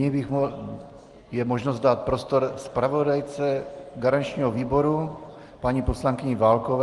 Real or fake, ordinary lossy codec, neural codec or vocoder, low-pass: real; Opus, 24 kbps; none; 10.8 kHz